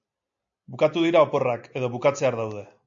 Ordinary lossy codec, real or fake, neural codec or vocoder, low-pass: AAC, 64 kbps; real; none; 7.2 kHz